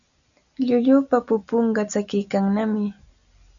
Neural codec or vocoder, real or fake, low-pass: none; real; 7.2 kHz